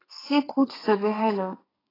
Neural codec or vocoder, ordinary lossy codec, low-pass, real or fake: codec, 32 kHz, 1.9 kbps, SNAC; AAC, 24 kbps; 5.4 kHz; fake